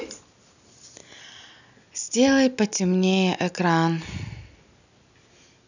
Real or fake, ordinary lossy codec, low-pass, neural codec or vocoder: real; none; 7.2 kHz; none